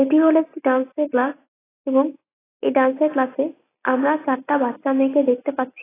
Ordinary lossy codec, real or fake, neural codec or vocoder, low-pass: AAC, 16 kbps; real; none; 3.6 kHz